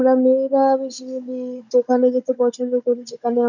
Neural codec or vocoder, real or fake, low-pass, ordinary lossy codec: codec, 16 kHz, 16 kbps, FunCodec, trained on Chinese and English, 50 frames a second; fake; 7.2 kHz; none